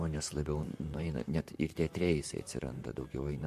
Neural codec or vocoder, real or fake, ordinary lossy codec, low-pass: vocoder, 44.1 kHz, 128 mel bands, Pupu-Vocoder; fake; MP3, 64 kbps; 14.4 kHz